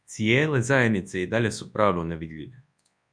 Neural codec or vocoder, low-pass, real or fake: codec, 24 kHz, 0.9 kbps, WavTokenizer, large speech release; 9.9 kHz; fake